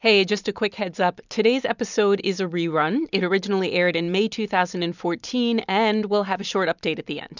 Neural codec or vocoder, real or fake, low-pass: vocoder, 44.1 kHz, 128 mel bands every 256 samples, BigVGAN v2; fake; 7.2 kHz